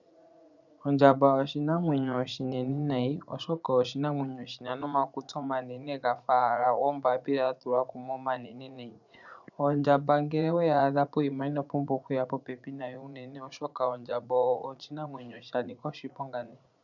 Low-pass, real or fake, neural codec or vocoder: 7.2 kHz; fake; vocoder, 44.1 kHz, 80 mel bands, Vocos